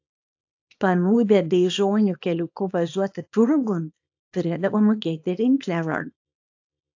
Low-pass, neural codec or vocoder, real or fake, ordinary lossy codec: 7.2 kHz; codec, 24 kHz, 0.9 kbps, WavTokenizer, small release; fake; AAC, 48 kbps